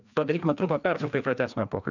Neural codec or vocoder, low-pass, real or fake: codec, 16 kHz, 2 kbps, FreqCodec, larger model; 7.2 kHz; fake